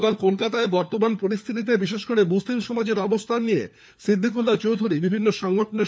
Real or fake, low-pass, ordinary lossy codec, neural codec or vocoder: fake; none; none; codec, 16 kHz, 4 kbps, FunCodec, trained on LibriTTS, 50 frames a second